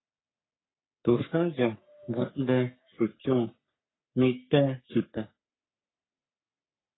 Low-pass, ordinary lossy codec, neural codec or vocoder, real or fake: 7.2 kHz; AAC, 16 kbps; codec, 44.1 kHz, 3.4 kbps, Pupu-Codec; fake